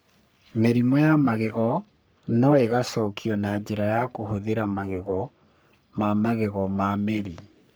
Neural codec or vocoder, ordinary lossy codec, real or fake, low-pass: codec, 44.1 kHz, 3.4 kbps, Pupu-Codec; none; fake; none